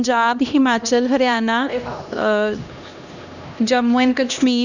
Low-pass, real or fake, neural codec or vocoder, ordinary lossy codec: 7.2 kHz; fake; codec, 16 kHz, 1 kbps, X-Codec, HuBERT features, trained on LibriSpeech; none